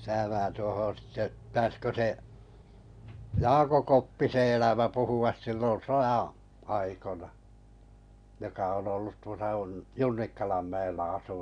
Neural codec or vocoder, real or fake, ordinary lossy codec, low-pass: none; real; none; 9.9 kHz